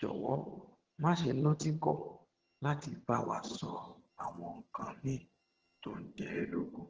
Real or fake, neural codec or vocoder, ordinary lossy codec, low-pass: fake; vocoder, 22.05 kHz, 80 mel bands, HiFi-GAN; Opus, 16 kbps; 7.2 kHz